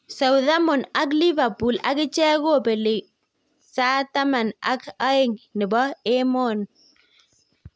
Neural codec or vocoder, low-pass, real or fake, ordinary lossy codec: none; none; real; none